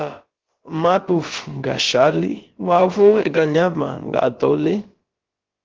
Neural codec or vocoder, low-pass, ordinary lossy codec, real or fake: codec, 16 kHz, about 1 kbps, DyCAST, with the encoder's durations; 7.2 kHz; Opus, 16 kbps; fake